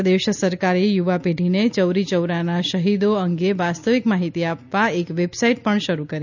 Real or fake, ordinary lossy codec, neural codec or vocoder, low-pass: real; none; none; 7.2 kHz